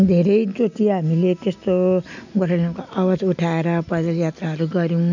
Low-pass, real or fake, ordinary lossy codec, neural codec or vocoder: 7.2 kHz; real; none; none